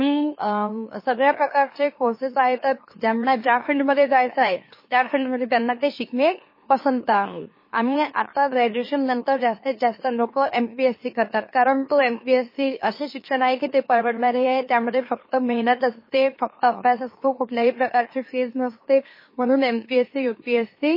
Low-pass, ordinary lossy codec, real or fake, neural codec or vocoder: 5.4 kHz; MP3, 24 kbps; fake; autoencoder, 44.1 kHz, a latent of 192 numbers a frame, MeloTTS